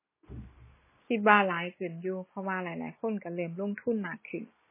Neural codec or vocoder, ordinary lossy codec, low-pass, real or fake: none; MP3, 16 kbps; 3.6 kHz; real